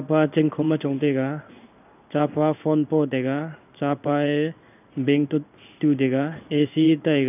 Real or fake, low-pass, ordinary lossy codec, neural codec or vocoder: fake; 3.6 kHz; none; codec, 16 kHz in and 24 kHz out, 1 kbps, XY-Tokenizer